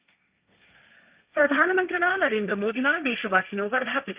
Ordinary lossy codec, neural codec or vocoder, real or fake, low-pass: Opus, 64 kbps; codec, 16 kHz, 1.1 kbps, Voila-Tokenizer; fake; 3.6 kHz